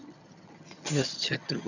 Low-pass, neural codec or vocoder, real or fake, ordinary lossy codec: 7.2 kHz; vocoder, 22.05 kHz, 80 mel bands, HiFi-GAN; fake; none